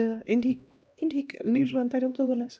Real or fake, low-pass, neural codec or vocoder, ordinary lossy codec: fake; none; codec, 16 kHz, 1 kbps, X-Codec, HuBERT features, trained on LibriSpeech; none